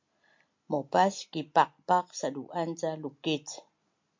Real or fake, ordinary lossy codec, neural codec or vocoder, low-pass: real; MP3, 48 kbps; none; 7.2 kHz